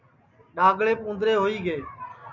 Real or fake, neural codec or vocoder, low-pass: real; none; 7.2 kHz